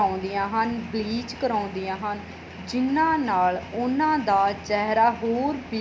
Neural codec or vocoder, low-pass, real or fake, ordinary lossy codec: none; none; real; none